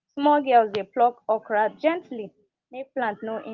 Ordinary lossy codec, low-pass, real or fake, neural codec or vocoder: Opus, 32 kbps; 7.2 kHz; real; none